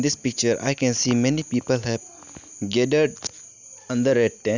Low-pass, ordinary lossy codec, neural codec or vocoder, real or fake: 7.2 kHz; none; none; real